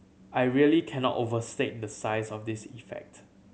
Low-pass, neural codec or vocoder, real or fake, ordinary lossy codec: none; none; real; none